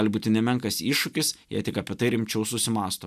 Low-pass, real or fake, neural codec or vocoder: 14.4 kHz; real; none